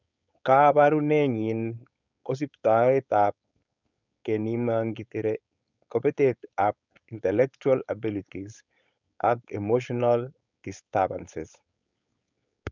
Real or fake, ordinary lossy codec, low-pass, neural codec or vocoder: fake; none; 7.2 kHz; codec, 16 kHz, 4.8 kbps, FACodec